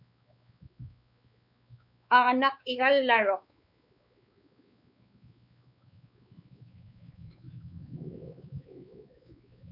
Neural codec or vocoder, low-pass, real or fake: codec, 16 kHz, 4 kbps, X-Codec, WavLM features, trained on Multilingual LibriSpeech; 5.4 kHz; fake